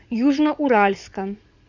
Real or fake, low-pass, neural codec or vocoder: fake; 7.2 kHz; vocoder, 44.1 kHz, 80 mel bands, Vocos